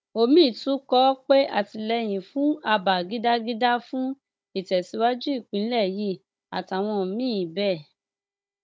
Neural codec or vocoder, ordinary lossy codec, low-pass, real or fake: codec, 16 kHz, 16 kbps, FunCodec, trained on Chinese and English, 50 frames a second; none; none; fake